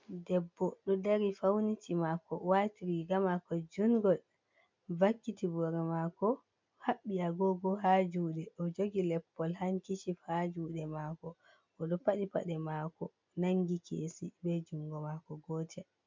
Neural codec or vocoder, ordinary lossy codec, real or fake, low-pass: none; MP3, 64 kbps; real; 7.2 kHz